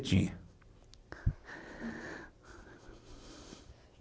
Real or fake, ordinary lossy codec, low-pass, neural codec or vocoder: real; none; none; none